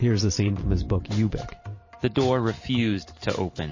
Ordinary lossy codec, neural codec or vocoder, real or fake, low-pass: MP3, 32 kbps; vocoder, 44.1 kHz, 128 mel bands every 512 samples, BigVGAN v2; fake; 7.2 kHz